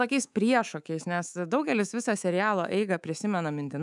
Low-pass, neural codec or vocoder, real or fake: 10.8 kHz; codec, 24 kHz, 3.1 kbps, DualCodec; fake